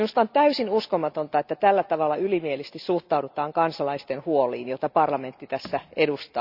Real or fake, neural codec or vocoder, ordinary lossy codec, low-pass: real; none; Opus, 64 kbps; 5.4 kHz